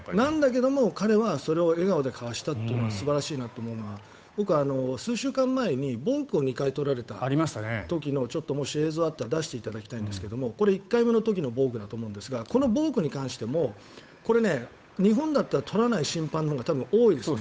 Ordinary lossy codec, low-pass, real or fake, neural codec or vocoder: none; none; fake; codec, 16 kHz, 8 kbps, FunCodec, trained on Chinese and English, 25 frames a second